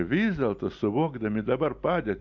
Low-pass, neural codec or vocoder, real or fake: 7.2 kHz; none; real